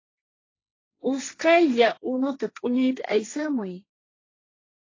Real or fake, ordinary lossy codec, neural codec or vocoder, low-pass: fake; AAC, 32 kbps; codec, 16 kHz, 1.1 kbps, Voila-Tokenizer; 7.2 kHz